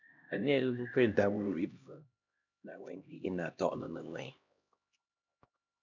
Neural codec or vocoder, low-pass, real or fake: codec, 16 kHz, 1 kbps, X-Codec, HuBERT features, trained on LibriSpeech; 7.2 kHz; fake